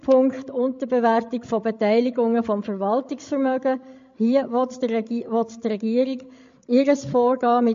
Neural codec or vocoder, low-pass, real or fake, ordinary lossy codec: codec, 16 kHz, 16 kbps, FreqCodec, larger model; 7.2 kHz; fake; MP3, 48 kbps